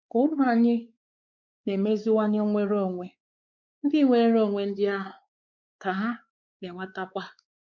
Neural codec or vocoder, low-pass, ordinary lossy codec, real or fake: codec, 16 kHz, 4 kbps, X-Codec, WavLM features, trained on Multilingual LibriSpeech; 7.2 kHz; none; fake